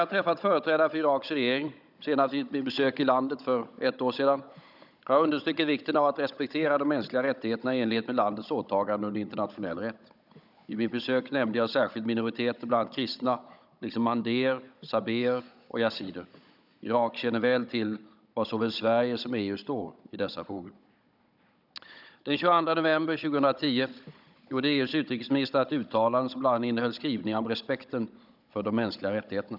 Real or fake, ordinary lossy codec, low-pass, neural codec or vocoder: fake; none; 5.4 kHz; codec, 16 kHz, 16 kbps, FunCodec, trained on Chinese and English, 50 frames a second